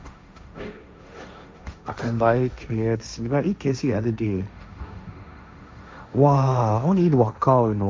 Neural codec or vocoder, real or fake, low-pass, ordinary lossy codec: codec, 16 kHz, 1.1 kbps, Voila-Tokenizer; fake; none; none